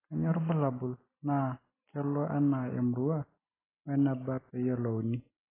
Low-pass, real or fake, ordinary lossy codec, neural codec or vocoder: 3.6 kHz; real; AAC, 16 kbps; none